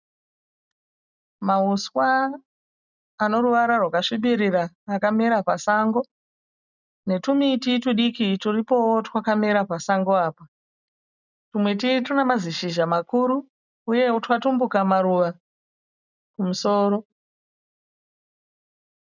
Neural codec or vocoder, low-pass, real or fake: none; 7.2 kHz; real